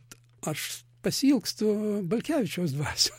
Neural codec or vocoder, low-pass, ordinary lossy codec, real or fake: none; 19.8 kHz; MP3, 64 kbps; real